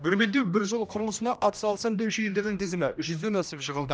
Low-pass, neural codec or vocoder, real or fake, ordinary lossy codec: none; codec, 16 kHz, 1 kbps, X-Codec, HuBERT features, trained on general audio; fake; none